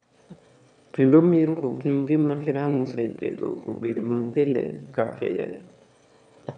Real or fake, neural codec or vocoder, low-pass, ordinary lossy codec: fake; autoencoder, 22.05 kHz, a latent of 192 numbers a frame, VITS, trained on one speaker; 9.9 kHz; none